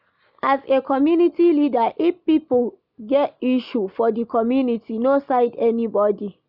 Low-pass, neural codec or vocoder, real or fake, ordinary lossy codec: 5.4 kHz; none; real; none